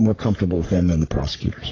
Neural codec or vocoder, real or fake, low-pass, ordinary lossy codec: codec, 44.1 kHz, 3.4 kbps, Pupu-Codec; fake; 7.2 kHz; AAC, 32 kbps